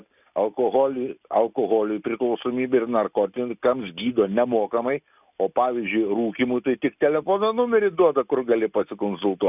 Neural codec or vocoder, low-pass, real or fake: none; 3.6 kHz; real